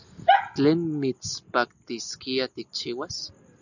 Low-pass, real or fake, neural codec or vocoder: 7.2 kHz; real; none